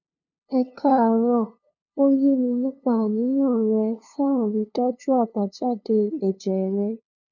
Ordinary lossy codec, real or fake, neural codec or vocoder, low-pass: none; fake; codec, 16 kHz, 2 kbps, FunCodec, trained on LibriTTS, 25 frames a second; 7.2 kHz